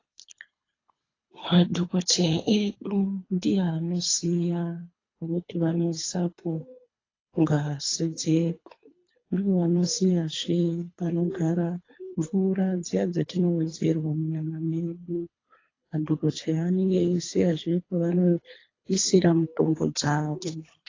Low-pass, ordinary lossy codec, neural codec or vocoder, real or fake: 7.2 kHz; AAC, 32 kbps; codec, 24 kHz, 3 kbps, HILCodec; fake